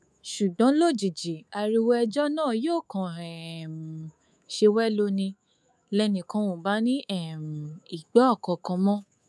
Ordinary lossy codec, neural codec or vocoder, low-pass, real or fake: none; codec, 24 kHz, 3.1 kbps, DualCodec; none; fake